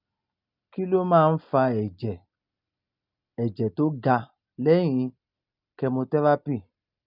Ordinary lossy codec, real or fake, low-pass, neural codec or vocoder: none; real; 5.4 kHz; none